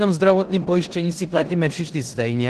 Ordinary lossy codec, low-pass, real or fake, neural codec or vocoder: Opus, 16 kbps; 10.8 kHz; fake; codec, 16 kHz in and 24 kHz out, 0.9 kbps, LongCat-Audio-Codec, four codebook decoder